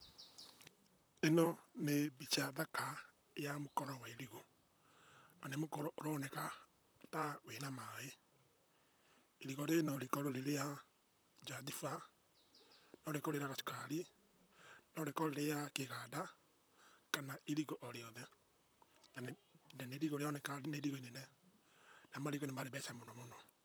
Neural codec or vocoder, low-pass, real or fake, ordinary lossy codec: vocoder, 44.1 kHz, 128 mel bands, Pupu-Vocoder; none; fake; none